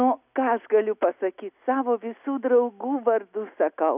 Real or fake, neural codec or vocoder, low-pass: real; none; 3.6 kHz